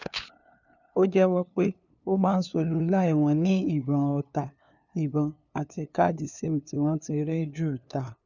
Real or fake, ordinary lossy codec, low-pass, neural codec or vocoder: fake; none; 7.2 kHz; codec, 16 kHz, 2 kbps, FunCodec, trained on LibriTTS, 25 frames a second